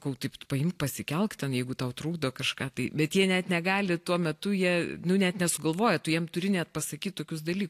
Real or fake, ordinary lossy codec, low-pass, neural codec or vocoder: real; AAC, 64 kbps; 14.4 kHz; none